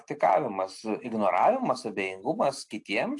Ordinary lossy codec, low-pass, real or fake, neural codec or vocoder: AAC, 64 kbps; 10.8 kHz; real; none